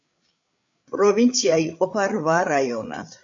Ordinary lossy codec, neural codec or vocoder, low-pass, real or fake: AAC, 64 kbps; codec, 16 kHz, 8 kbps, FreqCodec, larger model; 7.2 kHz; fake